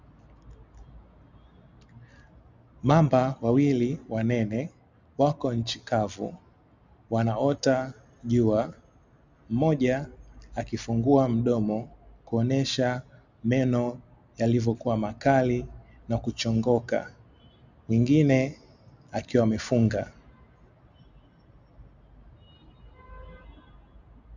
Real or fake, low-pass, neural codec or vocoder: real; 7.2 kHz; none